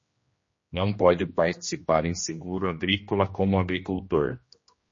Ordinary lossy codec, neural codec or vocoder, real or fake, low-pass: MP3, 32 kbps; codec, 16 kHz, 1 kbps, X-Codec, HuBERT features, trained on general audio; fake; 7.2 kHz